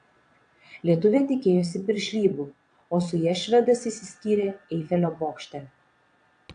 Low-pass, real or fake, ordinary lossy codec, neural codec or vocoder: 9.9 kHz; fake; AAC, 64 kbps; vocoder, 22.05 kHz, 80 mel bands, Vocos